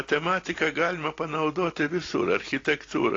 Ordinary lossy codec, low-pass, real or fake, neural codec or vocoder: AAC, 32 kbps; 7.2 kHz; real; none